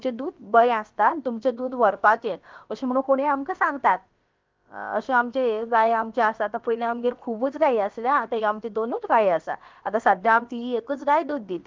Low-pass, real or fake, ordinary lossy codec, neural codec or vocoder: 7.2 kHz; fake; Opus, 24 kbps; codec, 16 kHz, about 1 kbps, DyCAST, with the encoder's durations